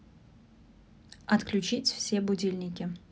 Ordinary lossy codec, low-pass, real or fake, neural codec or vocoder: none; none; real; none